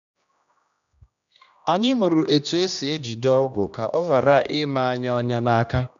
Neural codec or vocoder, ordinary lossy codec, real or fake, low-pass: codec, 16 kHz, 1 kbps, X-Codec, HuBERT features, trained on general audio; none; fake; 7.2 kHz